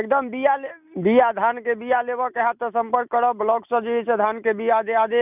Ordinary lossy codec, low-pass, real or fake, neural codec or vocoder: none; 3.6 kHz; real; none